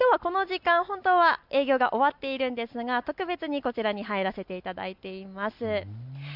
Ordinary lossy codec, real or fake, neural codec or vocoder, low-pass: none; real; none; 5.4 kHz